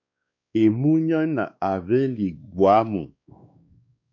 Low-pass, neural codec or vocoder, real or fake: 7.2 kHz; codec, 16 kHz, 2 kbps, X-Codec, WavLM features, trained on Multilingual LibriSpeech; fake